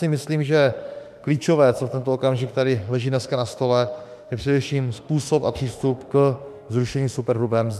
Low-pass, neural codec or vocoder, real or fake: 14.4 kHz; autoencoder, 48 kHz, 32 numbers a frame, DAC-VAE, trained on Japanese speech; fake